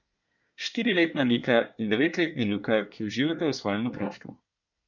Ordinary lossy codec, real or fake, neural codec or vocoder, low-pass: none; fake; codec, 24 kHz, 1 kbps, SNAC; 7.2 kHz